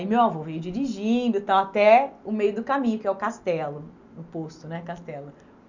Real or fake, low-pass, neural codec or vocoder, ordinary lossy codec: real; 7.2 kHz; none; none